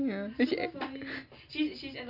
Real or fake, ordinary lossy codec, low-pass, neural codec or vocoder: real; none; 5.4 kHz; none